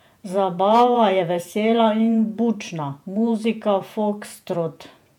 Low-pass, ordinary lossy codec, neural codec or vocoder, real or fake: 19.8 kHz; MP3, 96 kbps; vocoder, 48 kHz, 128 mel bands, Vocos; fake